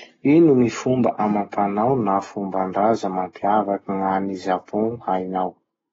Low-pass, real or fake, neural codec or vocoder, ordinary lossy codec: 7.2 kHz; real; none; AAC, 24 kbps